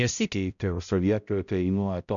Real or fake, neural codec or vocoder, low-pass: fake; codec, 16 kHz, 0.5 kbps, X-Codec, HuBERT features, trained on balanced general audio; 7.2 kHz